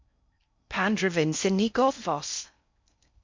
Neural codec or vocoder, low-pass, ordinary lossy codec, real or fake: codec, 16 kHz in and 24 kHz out, 0.6 kbps, FocalCodec, streaming, 2048 codes; 7.2 kHz; MP3, 64 kbps; fake